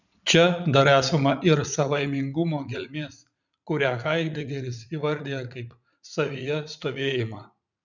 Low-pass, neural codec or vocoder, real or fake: 7.2 kHz; vocoder, 44.1 kHz, 80 mel bands, Vocos; fake